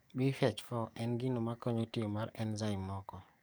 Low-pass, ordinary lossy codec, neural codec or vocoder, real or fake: none; none; codec, 44.1 kHz, 7.8 kbps, DAC; fake